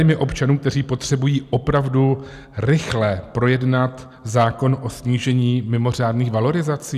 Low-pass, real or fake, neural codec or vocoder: 14.4 kHz; real; none